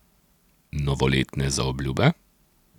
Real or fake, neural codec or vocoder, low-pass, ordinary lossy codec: real; none; 19.8 kHz; none